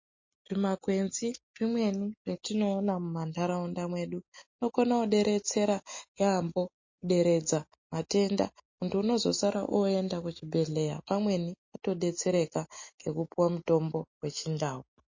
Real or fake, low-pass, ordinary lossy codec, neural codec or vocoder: real; 7.2 kHz; MP3, 32 kbps; none